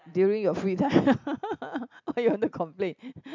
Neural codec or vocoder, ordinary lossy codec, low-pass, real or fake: none; MP3, 64 kbps; 7.2 kHz; real